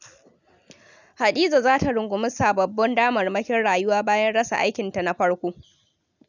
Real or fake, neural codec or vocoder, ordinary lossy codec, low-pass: real; none; none; 7.2 kHz